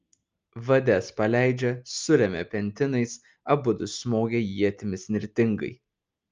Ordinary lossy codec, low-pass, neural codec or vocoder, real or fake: Opus, 24 kbps; 7.2 kHz; none; real